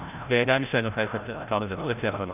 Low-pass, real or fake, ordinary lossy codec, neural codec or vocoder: 3.6 kHz; fake; none; codec, 16 kHz, 0.5 kbps, FreqCodec, larger model